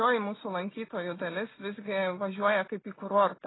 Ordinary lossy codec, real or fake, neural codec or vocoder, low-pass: AAC, 16 kbps; real; none; 7.2 kHz